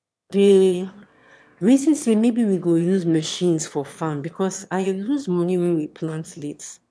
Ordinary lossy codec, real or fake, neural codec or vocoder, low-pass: none; fake; autoencoder, 22.05 kHz, a latent of 192 numbers a frame, VITS, trained on one speaker; none